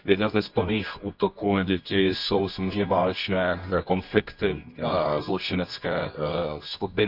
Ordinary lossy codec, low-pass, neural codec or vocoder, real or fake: none; 5.4 kHz; codec, 24 kHz, 0.9 kbps, WavTokenizer, medium music audio release; fake